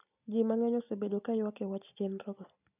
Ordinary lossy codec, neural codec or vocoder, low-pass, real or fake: none; none; 3.6 kHz; real